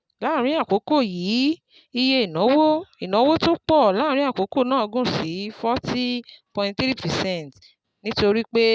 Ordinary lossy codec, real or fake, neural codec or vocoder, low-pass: none; real; none; none